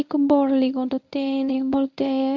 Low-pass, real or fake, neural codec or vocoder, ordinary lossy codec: 7.2 kHz; fake; codec, 24 kHz, 0.9 kbps, WavTokenizer, medium speech release version 1; none